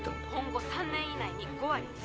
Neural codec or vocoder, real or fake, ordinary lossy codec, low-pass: none; real; none; none